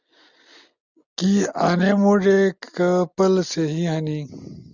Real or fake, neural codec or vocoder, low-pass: real; none; 7.2 kHz